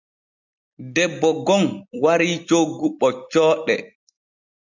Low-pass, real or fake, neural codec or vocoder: 7.2 kHz; real; none